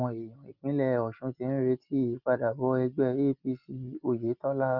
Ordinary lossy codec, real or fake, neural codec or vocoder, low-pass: Opus, 24 kbps; real; none; 5.4 kHz